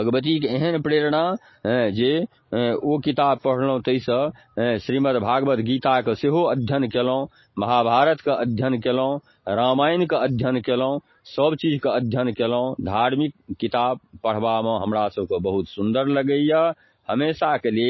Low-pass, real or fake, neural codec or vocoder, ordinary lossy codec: 7.2 kHz; real; none; MP3, 24 kbps